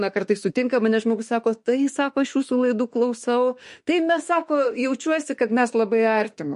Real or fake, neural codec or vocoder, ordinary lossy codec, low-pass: fake; autoencoder, 48 kHz, 32 numbers a frame, DAC-VAE, trained on Japanese speech; MP3, 48 kbps; 14.4 kHz